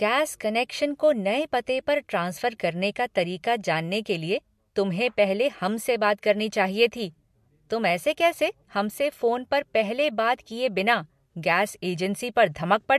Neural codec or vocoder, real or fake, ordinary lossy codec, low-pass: none; real; MP3, 64 kbps; 14.4 kHz